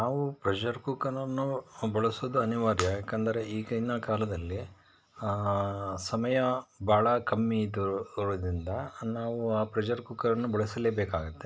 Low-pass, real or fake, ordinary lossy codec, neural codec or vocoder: none; real; none; none